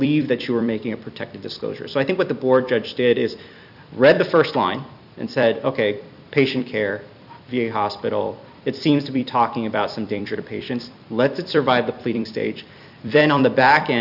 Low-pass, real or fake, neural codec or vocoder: 5.4 kHz; fake; vocoder, 44.1 kHz, 128 mel bands every 256 samples, BigVGAN v2